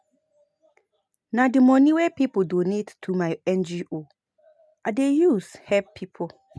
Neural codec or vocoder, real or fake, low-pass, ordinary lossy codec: none; real; none; none